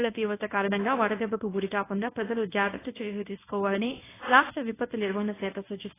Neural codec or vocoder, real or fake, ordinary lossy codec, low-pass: codec, 24 kHz, 0.9 kbps, WavTokenizer, medium speech release version 1; fake; AAC, 16 kbps; 3.6 kHz